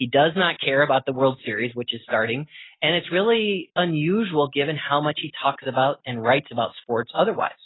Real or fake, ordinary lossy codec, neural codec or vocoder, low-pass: real; AAC, 16 kbps; none; 7.2 kHz